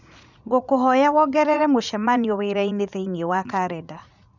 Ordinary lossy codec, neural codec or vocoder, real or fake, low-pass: none; vocoder, 44.1 kHz, 80 mel bands, Vocos; fake; 7.2 kHz